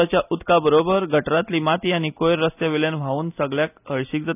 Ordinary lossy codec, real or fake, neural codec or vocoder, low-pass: none; real; none; 3.6 kHz